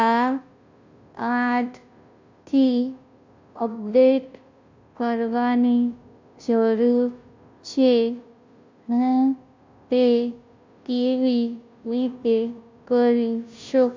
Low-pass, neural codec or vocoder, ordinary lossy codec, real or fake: 7.2 kHz; codec, 16 kHz, 0.5 kbps, FunCodec, trained on Chinese and English, 25 frames a second; MP3, 64 kbps; fake